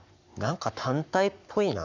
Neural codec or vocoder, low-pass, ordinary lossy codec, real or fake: codec, 44.1 kHz, 7.8 kbps, Pupu-Codec; 7.2 kHz; none; fake